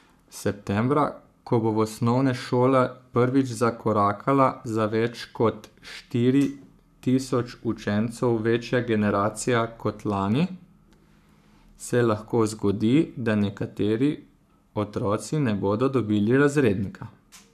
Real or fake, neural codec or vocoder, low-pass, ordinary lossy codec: fake; codec, 44.1 kHz, 7.8 kbps, Pupu-Codec; 14.4 kHz; none